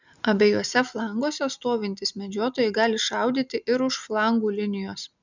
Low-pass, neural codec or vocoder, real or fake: 7.2 kHz; none; real